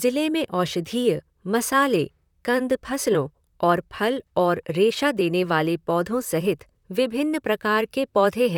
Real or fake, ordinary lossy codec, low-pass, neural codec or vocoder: fake; none; 19.8 kHz; vocoder, 44.1 kHz, 128 mel bands, Pupu-Vocoder